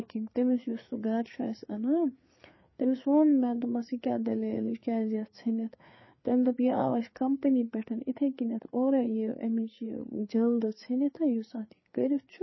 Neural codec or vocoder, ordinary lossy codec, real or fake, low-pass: codec, 16 kHz, 4 kbps, FreqCodec, larger model; MP3, 24 kbps; fake; 7.2 kHz